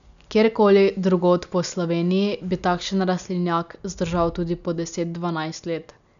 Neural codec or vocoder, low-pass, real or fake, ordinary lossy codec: none; 7.2 kHz; real; none